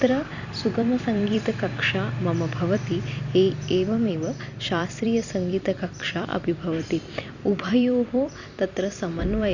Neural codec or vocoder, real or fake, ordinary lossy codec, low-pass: vocoder, 44.1 kHz, 128 mel bands every 256 samples, BigVGAN v2; fake; none; 7.2 kHz